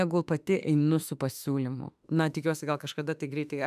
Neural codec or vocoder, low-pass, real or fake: autoencoder, 48 kHz, 32 numbers a frame, DAC-VAE, trained on Japanese speech; 14.4 kHz; fake